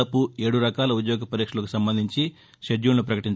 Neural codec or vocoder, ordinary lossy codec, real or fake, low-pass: none; none; real; none